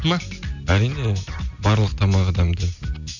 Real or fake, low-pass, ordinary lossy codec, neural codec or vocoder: real; 7.2 kHz; none; none